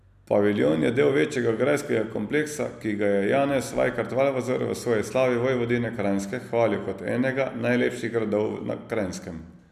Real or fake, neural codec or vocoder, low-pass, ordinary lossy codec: real; none; 14.4 kHz; none